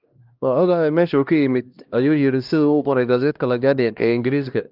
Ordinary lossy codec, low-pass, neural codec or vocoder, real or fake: Opus, 24 kbps; 5.4 kHz; codec, 16 kHz, 1 kbps, X-Codec, HuBERT features, trained on LibriSpeech; fake